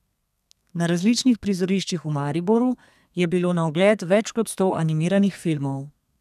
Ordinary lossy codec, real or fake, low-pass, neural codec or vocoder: none; fake; 14.4 kHz; codec, 32 kHz, 1.9 kbps, SNAC